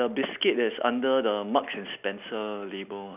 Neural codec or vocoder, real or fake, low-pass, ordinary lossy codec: none; real; 3.6 kHz; none